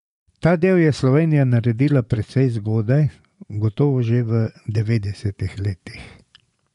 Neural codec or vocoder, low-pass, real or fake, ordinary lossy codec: vocoder, 24 kHz, 100 mel bands, Vocos; 10.8 kHz; fake; none